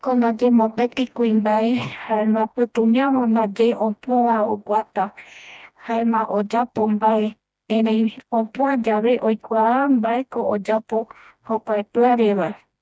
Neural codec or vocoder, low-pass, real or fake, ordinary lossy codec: codec, 16 kHz, 1 kbps, FreqCodec, smaller model; none; fake; none